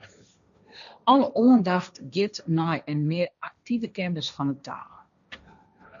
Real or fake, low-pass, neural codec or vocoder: fake; 7.2 kHz; codec, 16 kHz, 1.1 kbps, Voila-Tokenizer